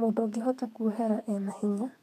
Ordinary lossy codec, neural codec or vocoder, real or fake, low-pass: none; codec, 32 kHz, 1.9 kbps, SNAC; fake; 14.4 kHz